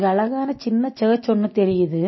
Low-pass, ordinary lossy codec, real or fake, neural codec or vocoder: 7.2 kHz; MP3, 24 kbps; real; none